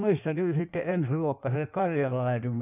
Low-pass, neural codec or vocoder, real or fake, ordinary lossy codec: 3.6 kHz; codec, 16 kHz in and 24 kHz out, 1.1 kbps, FireRedTTS-2 codec; fake; none